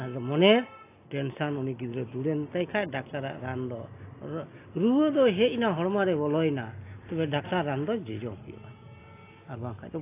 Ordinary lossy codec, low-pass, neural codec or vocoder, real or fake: AAC, 24 kbps; 3.6 kHz; none; real